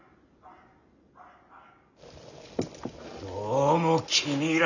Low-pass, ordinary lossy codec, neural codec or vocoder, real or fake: 7.2 kHz; none; none; real